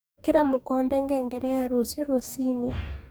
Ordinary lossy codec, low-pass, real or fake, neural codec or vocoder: none; none; fake; codec, 44.1 kHz, 2.6 kbps, DAC